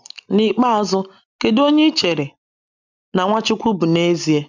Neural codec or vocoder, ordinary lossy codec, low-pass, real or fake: none; none; 7.2 kHz; real